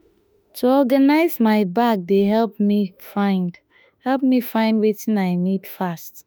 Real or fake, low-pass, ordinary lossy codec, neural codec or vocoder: fake; none; none; autoencoder, 48 kHz, 32 numbers a frame, DAC-VAE, trained on Japanese speech